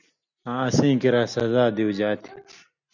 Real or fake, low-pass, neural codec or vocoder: real; 7.2 kHz; none